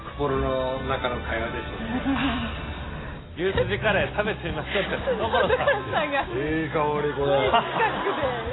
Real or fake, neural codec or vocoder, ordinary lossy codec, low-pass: real; none; AAC, 16 kbps; 7.2 kHz